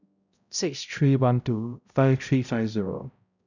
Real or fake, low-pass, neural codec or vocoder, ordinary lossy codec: fake; 7.2 kHz; codec, 16 kHz, 0.5 kbps, X-Codec, HuBERT features, trained on balanced general audio; none